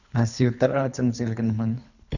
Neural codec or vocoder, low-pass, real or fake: codec, 24 kHz, 3 kbps, HILCodec; 7.2 kHz; fake